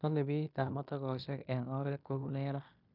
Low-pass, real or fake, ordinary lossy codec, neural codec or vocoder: 5.4 kHz; fake; none; codec, 16 kHz in and 24 kHz out, 0.9 kbps, LongCat-Audio-Codec, fine tuned four codebook decoder